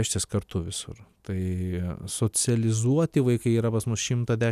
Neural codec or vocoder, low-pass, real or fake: none; 14.4 kHz; real